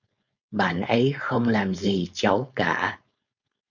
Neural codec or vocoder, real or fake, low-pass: codec, 16 kHz, 4.8 kbps, FACodec; fake; 7.2 kHz